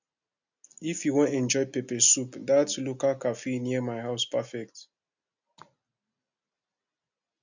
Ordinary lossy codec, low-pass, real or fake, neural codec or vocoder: none; 7.2 kHz; real; none